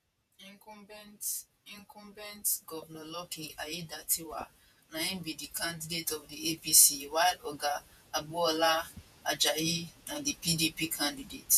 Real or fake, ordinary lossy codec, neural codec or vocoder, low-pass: fake; none; vocoder, 48 kHz, 128 mel bands, Vocos; 14.4 kHz